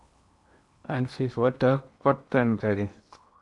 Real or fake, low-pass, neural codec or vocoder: fake; 10.8 kHz; codec, 16 kHz in and 24 kHz out, 0.8 kbps, FocalCodec, streaming, 65536 codes